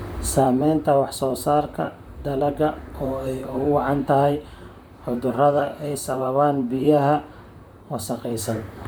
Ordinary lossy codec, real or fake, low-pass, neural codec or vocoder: none; fake; none; vocoder, 44.1 kHz, 128 mel bands, Pupu-Vocoder